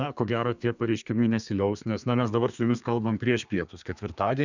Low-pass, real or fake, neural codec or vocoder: 7.2 kHz; fake; codec, 32 kHz, 1.9 kbps, SNAC